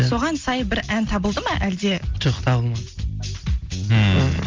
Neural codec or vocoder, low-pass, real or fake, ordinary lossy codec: none; 7.2 kHz; real; Opus, 32 kbps